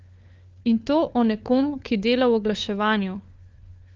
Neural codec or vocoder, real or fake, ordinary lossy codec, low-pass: codec, 16 kHz, 4 kbps, FunCodec, trained on LibriTTS, 50 frames a second; fake; Opus, 24 kbps; 7.2 kHz